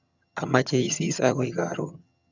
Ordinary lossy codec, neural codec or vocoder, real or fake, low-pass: none; vocoder, 22.05 kHz, 80 mel bands, HiFi-GAN; fake; 7.2 kHz